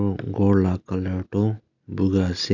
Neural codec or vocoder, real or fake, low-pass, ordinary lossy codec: none; real; 7.2 kHz; none